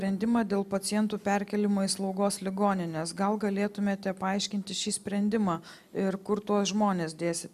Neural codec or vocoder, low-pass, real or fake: none; 14.4 kHz; real